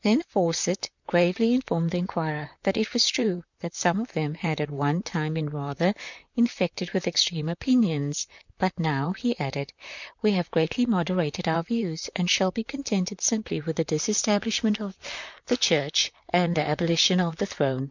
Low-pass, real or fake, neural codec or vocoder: 7.2 kHz; fake; vocoder, 44.1 kHz, 128 mel bands, Pupu-Vocoder